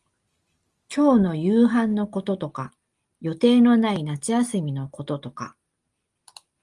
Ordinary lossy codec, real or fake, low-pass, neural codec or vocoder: Opus, 32 kbps; real; 10.8 kHz; none